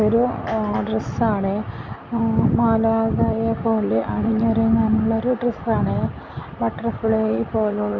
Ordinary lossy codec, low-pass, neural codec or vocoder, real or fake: Opus, 32 kbps; 7.2 kHz; none; real